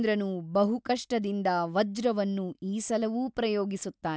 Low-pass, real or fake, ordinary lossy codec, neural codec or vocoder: none; real; none; none